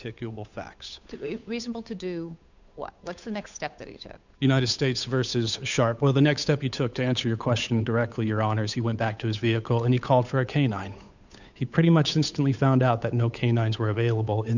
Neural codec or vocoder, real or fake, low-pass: codec, 16 kHz, 8 kbps, FunCodec, trained on Chinese and English, 25 frames a second; fake; 7.2 kHz